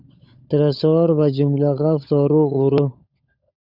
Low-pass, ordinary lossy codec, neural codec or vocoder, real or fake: 5.4 kHz; Opus, 64 kbps; codec, 16 kHz, 16 kbps, FunCodec, trained on LibriTTS, 50 frames a second; fake